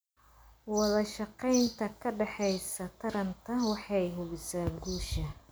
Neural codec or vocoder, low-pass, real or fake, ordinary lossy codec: none; none; real; none